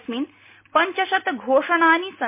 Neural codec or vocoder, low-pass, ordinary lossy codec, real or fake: none; 3.6 kHz; MP3, 24 kbps; real